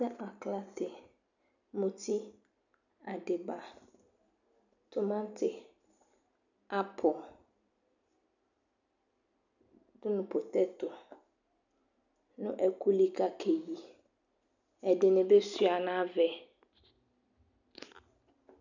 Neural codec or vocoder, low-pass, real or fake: none; 7.2 kHz; real